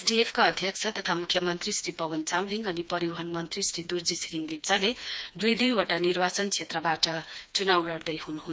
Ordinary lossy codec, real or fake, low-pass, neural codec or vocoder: none; fake; none; codec, 16 kHz, 2 kbps, FreqCodec, smaller model